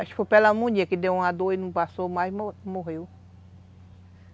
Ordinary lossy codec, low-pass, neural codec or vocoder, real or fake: none; none; none; real